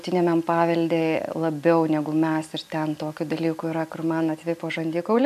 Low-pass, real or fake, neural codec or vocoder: 14.4 kHz; real; none